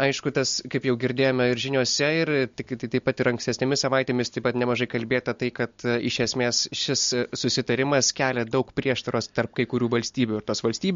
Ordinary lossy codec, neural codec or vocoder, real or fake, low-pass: MP3, 48 kbps; none; real; 7.2 kHz